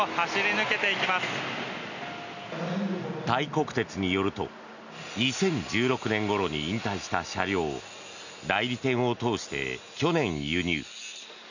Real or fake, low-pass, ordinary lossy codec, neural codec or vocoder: real; 7.2 kHz; none; none